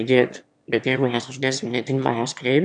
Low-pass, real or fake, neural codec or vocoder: 9.9 kHz; fake; autoencoder, 22.05 kHz, a latent of 192 numbers a frame, VITS, trained on one speaker